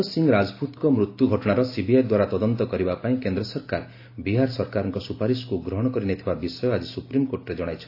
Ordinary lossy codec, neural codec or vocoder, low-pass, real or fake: AAC, 32 kbps; none; 5.4 kHz; real